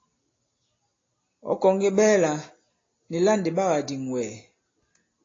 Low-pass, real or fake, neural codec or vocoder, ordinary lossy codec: 7.2 kHz; real; none; AAC, 32 kbps